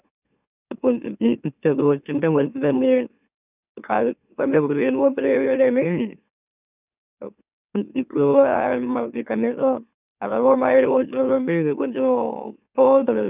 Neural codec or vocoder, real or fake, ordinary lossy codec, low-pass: autoencoder, 44.1 kHz, a latent of 192 numbers a frame, MeloTTS; fake; none; 3.6 kHz